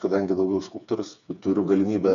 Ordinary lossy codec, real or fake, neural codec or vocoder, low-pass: AAC, 96 kbps; fake; codec, 16 kHz, 4 kbps, FreqCodec, smaller model; 7.2 kHz